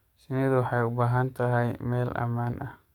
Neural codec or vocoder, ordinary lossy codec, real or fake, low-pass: codec, 44.1 kHz, 7.8 kbps, DAC; none; fake; 19.8 kHz